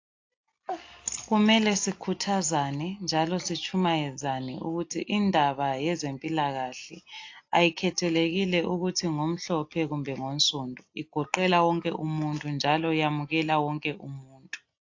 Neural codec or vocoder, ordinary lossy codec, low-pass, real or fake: none; AAC, 48 kbps; 7.2 kHz; real